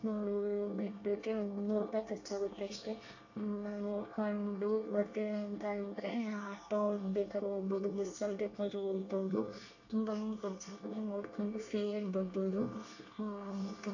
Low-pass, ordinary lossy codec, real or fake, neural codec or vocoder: 7.2 kHz; AAC, 48 kbps; fake; codec, 24 kHz, 1 kbps, SNAC